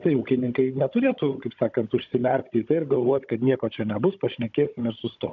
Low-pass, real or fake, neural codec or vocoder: 7.2 kHz; fake; codec, 16 kHz, 16 kbps, FunCodec, trained on Chinese and English, 50 frames a second